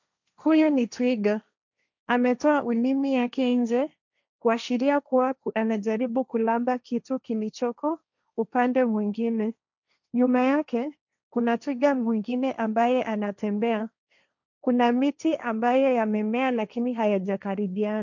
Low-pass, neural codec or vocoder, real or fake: 7.2 kHz; codec, 16 kHz, 1.1 kbps, Voila-Tokenizer; fake